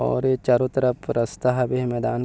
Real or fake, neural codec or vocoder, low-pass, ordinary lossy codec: real; none; none; none